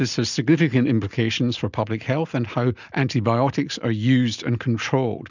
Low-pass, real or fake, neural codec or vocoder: 7.2 kHz; real; none